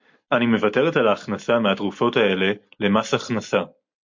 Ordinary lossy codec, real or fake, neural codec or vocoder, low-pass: MP3, 48 kbps; real; none; 7.2 kHz